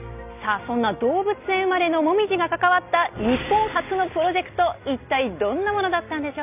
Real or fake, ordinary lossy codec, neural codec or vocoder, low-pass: real; none; none; 3.6 kHz